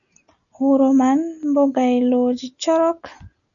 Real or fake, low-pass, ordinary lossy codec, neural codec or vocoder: real; 7.2 kHz; MP3, 96 kbps; none